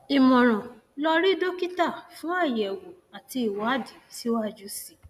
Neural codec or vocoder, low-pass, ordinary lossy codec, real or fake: none; 14.4 kHz; none; real